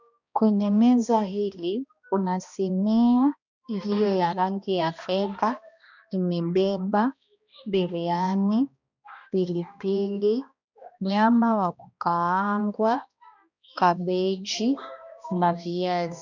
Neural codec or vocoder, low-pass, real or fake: codec, 16 kHz, 1 kbps, X-Codec, HuBERT features, trained on balanced general audio; 7.2 kHz; fake